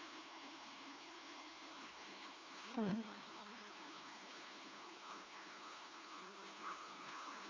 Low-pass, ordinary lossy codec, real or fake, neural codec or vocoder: 7.2 kHz; AAC, 32 kbps; fake; codec, 16 kHz, 1 kbps, FunCodec, trained on LibriTTS, 50 frames a second